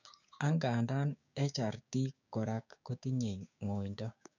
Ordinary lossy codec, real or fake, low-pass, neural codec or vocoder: none; fake; 7.2 kHz; codec, 16 kHz, 6 kbps, DAC